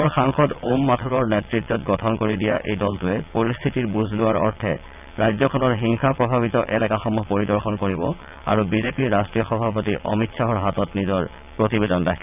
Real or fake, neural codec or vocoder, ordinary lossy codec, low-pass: fake; vocoder, 22.05 kHz, 80 mel bands, WaveNeXt; none; 3.6 kHz